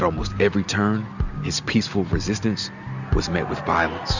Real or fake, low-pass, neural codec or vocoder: real; 7.2 kHz; none